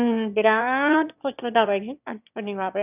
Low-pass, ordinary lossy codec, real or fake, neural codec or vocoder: 3.6 kHz; none; fake; autoencoder, 22.05 kHz, a latent of 192 numbers a frame, VITS, trained on one speaker